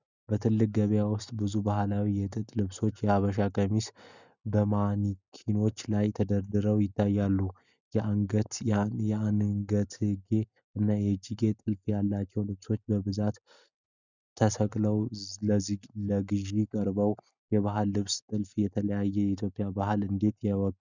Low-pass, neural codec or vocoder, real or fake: 7.2 kHz; none; real